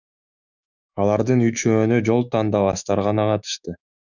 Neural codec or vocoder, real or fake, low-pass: autoencoder, 48 kHz, 128 numbers a frame, DAC-VAE, trained on Japanese speech; fake; 7.2 kHz